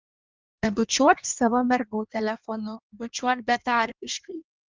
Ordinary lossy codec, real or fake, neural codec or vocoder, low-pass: Opus, 24 kbps; fake; codec, 16 kHz in and 24 kHz out, 1.1 kbps, FireRedTTS-2 codec; 7.2 kHz